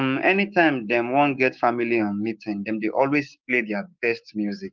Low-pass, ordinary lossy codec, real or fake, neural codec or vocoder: 7.2 kHz; Opus, 24 kbps; fake; codec, 16 kHz, 6 kbps, DAC